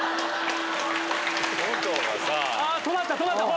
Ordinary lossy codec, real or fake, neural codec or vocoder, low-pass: none; real; none; none